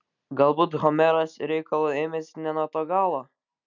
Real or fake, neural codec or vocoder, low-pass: real; none; 7.2 kHz